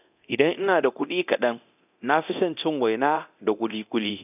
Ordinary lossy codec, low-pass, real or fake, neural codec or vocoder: none; 3.6 kHz; fake; codec, 24 kHz, 0.9 kbps, DualCodec